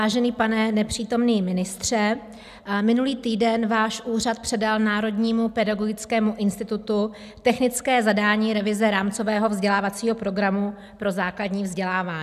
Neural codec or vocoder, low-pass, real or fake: none; 14.4 kHz; real